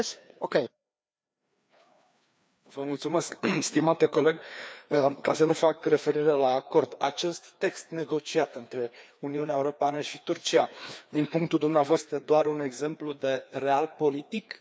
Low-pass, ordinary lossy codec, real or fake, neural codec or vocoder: none; none; fake; codec, 16 kHz, 2 kbps, FreqCodec, larger model